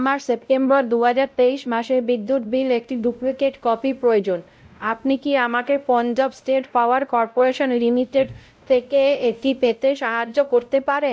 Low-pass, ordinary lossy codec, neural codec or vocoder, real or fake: none; none; codec, 16 kHz, 0.5 kbps, X-Codec, WavLM features, trained on Multilingual LibriSpeech; fake